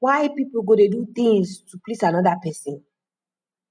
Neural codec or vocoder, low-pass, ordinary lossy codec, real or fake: none; 9.9 kHz; none; real